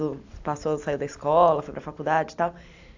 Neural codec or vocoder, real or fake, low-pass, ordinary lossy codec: none; real; 7.2 kHz; AAC, 48 kbps